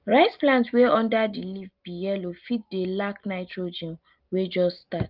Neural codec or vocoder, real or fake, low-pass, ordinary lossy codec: none; real; 5.4 kHz; Opus, 32 kbps